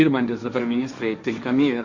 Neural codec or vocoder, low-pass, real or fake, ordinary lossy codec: codec, 16 kHz, 1.1 kbps, Voila-Tokenizer; 7.2 kHz; fake; Opus, 64 kbps